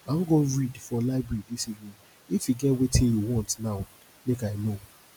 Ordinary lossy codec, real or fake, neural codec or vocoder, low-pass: none; real; none; none